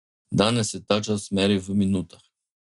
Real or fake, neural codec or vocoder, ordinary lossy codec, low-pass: real; none; MP3, 96 kbps; 10.8 kHz